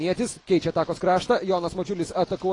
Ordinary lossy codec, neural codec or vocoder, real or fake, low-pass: AAC, 32 kbps; none; real; 10.8 kHz